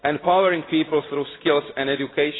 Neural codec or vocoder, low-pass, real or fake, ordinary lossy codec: none; 7.2 kHz; real; AAC, 16 kbps